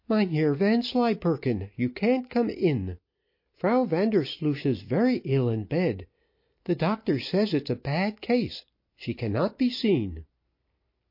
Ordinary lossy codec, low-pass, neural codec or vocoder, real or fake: MP3, 32 kbps; 5.4 kHz; none; real